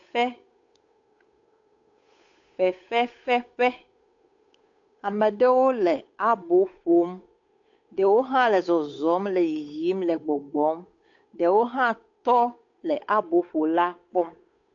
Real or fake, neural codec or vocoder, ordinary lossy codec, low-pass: fake; codec, 16 kHz, 8 kbps, FunCodec, trained on Chinese and English, 25 frames a second; AAC, 48 kbps; 7.2 kHz